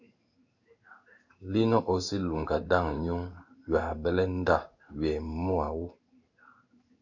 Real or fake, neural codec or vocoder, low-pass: fake; codec, 16 kHz in and 24 kHz out, 1 kbps, XY-Tokenizer; 7.2 kHz